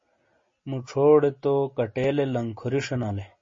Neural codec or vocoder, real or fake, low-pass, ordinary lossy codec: none; real; 7.2 kHz; MP3, 32 kbps